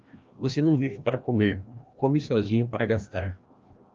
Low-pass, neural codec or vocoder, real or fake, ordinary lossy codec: 7.2 kHz; codec, 16 kHz, 1 kbps, FreqCodec, larger model; fake; Opus, 32 kbps